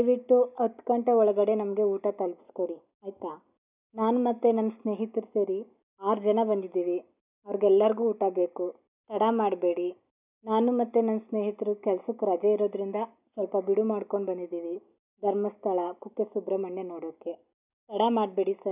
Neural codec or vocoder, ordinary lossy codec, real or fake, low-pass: none; none; real; 3.6 kHz